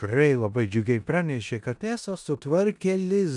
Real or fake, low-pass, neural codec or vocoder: fake; 10.8 kHz; codec, 16 kHz in and 24 kHz out, 0.9 kbps, LongCat-Audio-Codec, four codebook decoder